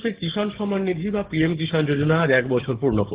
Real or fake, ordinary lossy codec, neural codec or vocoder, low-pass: fake; Opus, 16 kbps; codec, 16 kHz, 6 kbps, DAC; 3.6 kHz